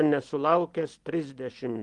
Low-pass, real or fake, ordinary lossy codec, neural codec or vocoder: 10.8 kHz; real; Opus, 24 kbps; none